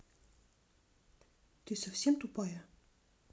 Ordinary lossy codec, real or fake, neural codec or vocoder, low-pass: none; real; none; none